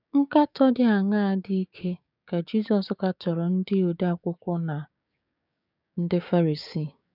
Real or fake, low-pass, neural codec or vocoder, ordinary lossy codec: fake; 5.4 kHz; codec, 16 kHz, 6 kbps, DAC; none